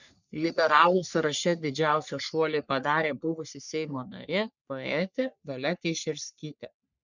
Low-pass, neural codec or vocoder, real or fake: 7.2 kHz; codec, 44.1 kHz, 3.4 kbps, Pupu-Codec; fake